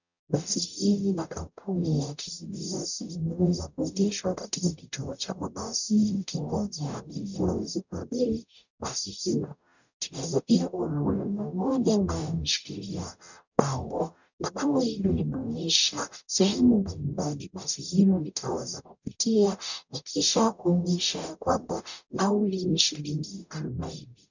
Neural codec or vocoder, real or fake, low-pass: codec, 44.1 kHz, 0.9 kbps, DAC; fake; 7.2 kHz